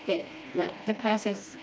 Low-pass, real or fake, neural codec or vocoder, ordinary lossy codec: none; fake; codec, 16 kHz, 1 kbps, FreqCodec, smaller model; none